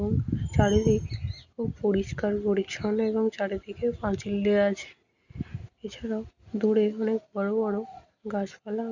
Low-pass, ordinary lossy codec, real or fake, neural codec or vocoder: 7.2 kHz; Opus, 64 kbps; real; none